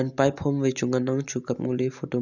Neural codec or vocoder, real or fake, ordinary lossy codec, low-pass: none; real; none; 7.2 kHz